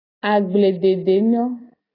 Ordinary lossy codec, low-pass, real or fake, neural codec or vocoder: AAC, 24 kbps; 5.4 kHz; real; none